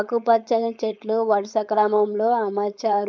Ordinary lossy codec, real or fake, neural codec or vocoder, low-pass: none; fake; codec, 16 kHz, 4.8 kbps, FACodec; none